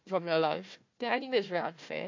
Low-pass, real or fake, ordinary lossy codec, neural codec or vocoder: 7.2 kHz; fake; MP3, 48 kbps; codec, 16 kHz, 1 kbps, FunCodec, trained on Chinese and English, 50 frames a second